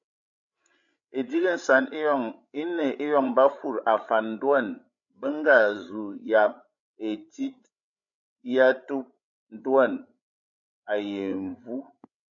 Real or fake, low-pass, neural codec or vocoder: fake; 7.2 kHz; codec, 16 kHz, 16 kbps, FreqCodec, larger model